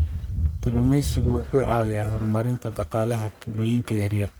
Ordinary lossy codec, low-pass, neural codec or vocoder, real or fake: none; none; codec, 44.1 kHz, 1.7 kbps, Pupu-Codec; fake